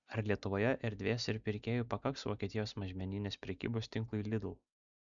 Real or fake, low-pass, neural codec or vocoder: real; 7.2 kHz; none